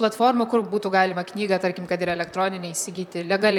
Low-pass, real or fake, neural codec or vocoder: 19.8 kHz; fake; vocoder, 44.1 kHz, 128 mel bands, Pupu-Vocoder